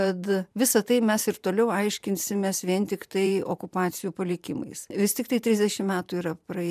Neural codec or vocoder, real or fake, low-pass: vocoder, 44.1 kHz, 128 mel bands every 512 samples, BigVGAN v2; fake; 14.4 kHz